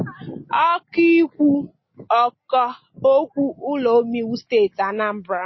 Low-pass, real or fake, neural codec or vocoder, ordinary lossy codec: 7.2 kHz; real; none; MP3, 24 kbps